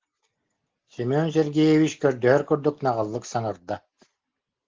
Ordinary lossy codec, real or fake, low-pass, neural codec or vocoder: Opus, 16 kbps; real; 7.2 kHz; none